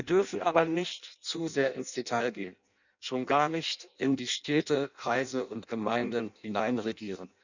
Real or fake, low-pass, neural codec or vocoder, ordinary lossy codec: fake; 7.2 kHz; codec, 16 kHz in and 24 kHz out, 0.6 kbps, FireRedTTS-2 codec; none